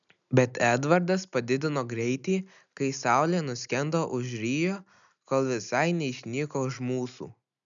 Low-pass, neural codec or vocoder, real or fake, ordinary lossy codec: 7.2 kHz; none; real; MP3, 96 kbps